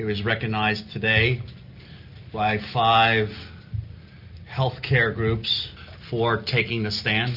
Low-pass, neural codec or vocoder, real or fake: 5.4 kHz; none; real